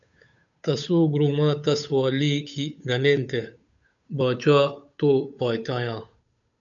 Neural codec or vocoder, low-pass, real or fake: codec, 16 kHz, 8 kbps, FunCodec, trained on Chinese and English, 25 frames a second; 7.2 kHz; fake